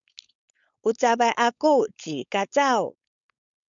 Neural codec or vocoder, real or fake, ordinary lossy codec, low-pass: codec, 16 kHz, 4.8 kbps, FACodec; fake; MP3, 96 kbps; 7.2 kHz